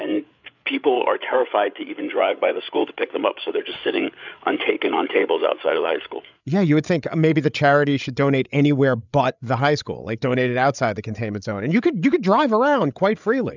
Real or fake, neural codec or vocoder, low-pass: fake; codec, 16 kHz, 8 kbps, FreqCodec, larger model; 7.2 kHz